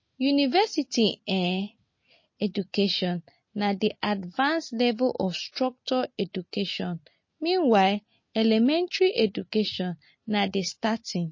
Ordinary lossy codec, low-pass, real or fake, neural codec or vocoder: MP3, 32 kbps; 7.2 kHz; real; none